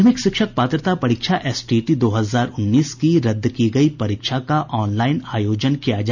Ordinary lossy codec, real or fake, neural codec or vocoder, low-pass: none; real; none; 7.2 kHz